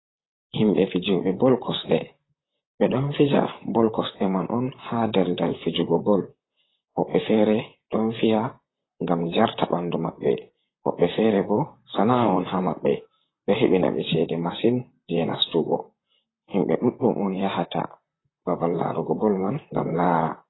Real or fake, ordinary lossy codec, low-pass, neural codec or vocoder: fake; AAC, 16 kbps; 7.2 kHz; vocoder, 44.1 kHz, 128 mel bands, Pupu-Vocoder